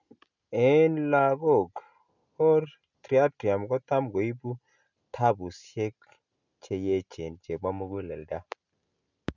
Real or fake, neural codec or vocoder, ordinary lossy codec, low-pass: real; none; none; 7.2 kHz